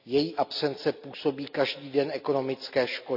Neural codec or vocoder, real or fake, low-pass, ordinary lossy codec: none; real; 5.4 kHz; none